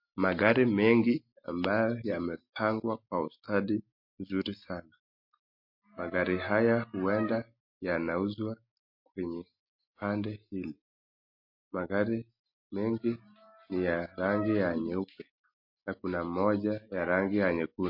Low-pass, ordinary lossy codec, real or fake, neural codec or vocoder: 5.4 kHz; MP3, 32 kbps; real; none